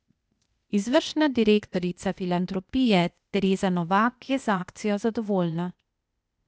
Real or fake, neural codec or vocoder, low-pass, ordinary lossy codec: fake; codec, 16 kHz, 0.8 kbps, ZipCodec; none; none